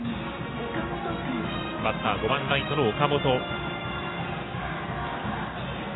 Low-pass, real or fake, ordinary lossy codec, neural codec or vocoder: 7.2 kHz; real; AAC, 16 kbps; none